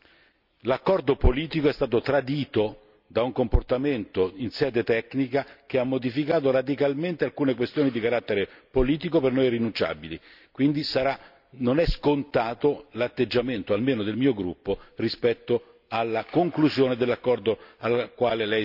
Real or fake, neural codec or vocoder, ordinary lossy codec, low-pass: real; none; none; 5.4 kHz